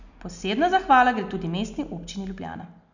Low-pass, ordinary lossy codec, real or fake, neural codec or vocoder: 7.2 kHz; none; real; none